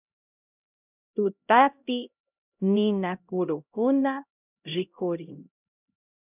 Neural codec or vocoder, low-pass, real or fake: codec, 16 kHz, 0.5 kbps, X-Codec, HuBERT features, trained on LibriSpeech; 3.6 kHz; fake